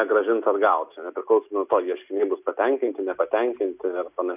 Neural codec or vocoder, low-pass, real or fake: none; 3.6 kHz; real